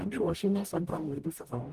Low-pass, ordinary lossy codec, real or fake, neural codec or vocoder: 14.4 kHz; Opus, 24 kbps; fake; codec, 44.1 kHz, 0.9 kbps, DAC